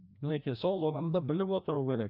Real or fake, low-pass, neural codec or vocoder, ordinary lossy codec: fake; 5.4 kHz; codec, 16 kHz, 1 kbps, FreqCodec, larger model; none